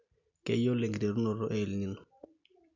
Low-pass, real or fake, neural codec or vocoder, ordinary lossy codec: 7.2 kHz; real; none; none